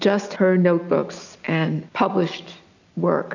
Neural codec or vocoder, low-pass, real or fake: none; 7.2 kHz; real